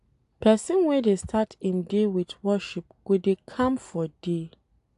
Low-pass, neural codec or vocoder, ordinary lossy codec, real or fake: 10.8 kHz; none; AAC, 64 kbps; real